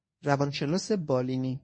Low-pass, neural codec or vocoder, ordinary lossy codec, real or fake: 9.9 kHz; codec, 24 kHz, 0.9 kbps, WavTokenizer, medium speech release version 1; MP3, 32 kbps; fake